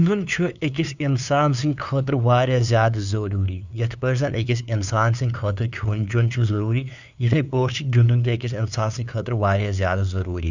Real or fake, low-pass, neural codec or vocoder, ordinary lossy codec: fake; 7.2 kHz; codec, 16 kHz, 2 kbps, FunCodec, trained on LibriTTS, 25 frames a second; none